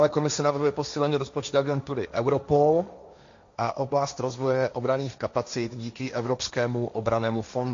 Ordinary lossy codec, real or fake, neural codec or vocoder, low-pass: MP3, 64 kbps; fake; codec, 16 kHz, 1.1 kbps, Voila-Tokenizer; 7.2 kHz